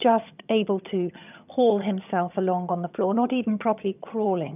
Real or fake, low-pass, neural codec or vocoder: fake; 3.6 kHz; vocoder, 22.05 kHz, 80 mel bands, HiFi-GAN